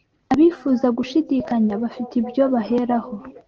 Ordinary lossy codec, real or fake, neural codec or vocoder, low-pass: Opus, 16 kbps; real; none; 7.2 kHz